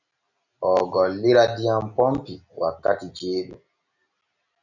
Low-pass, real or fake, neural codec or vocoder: 7.2 kHz; real; none